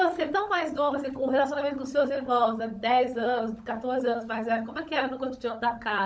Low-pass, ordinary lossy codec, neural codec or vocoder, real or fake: none; none; codec, 16 kHz, 16 kbps, FunCodec, trained on LibriTTS, 50 frames a second; fake